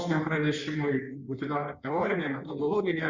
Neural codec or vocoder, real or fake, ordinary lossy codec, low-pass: codec, 16 kHz in and 24 kHz out, 1.1 kbps, FireRedTTS-2 codec; fake; Opus, 64 kbps; 7.2 kHz